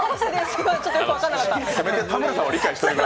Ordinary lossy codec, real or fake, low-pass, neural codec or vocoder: none; real; none; none